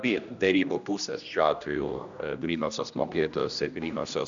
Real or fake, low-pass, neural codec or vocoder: fake; 7.2 kHz; codec, 16 kHz, 1 kbps, X-Codec, HuBERT features, trained on general audio